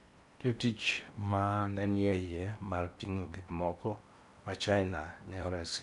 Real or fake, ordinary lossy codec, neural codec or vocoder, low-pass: fake; none; codec, 16 kHz in and 24 kHz out, 0.8 kbps, FocalCodec, streaming, 65536 codes; 10.8 kHz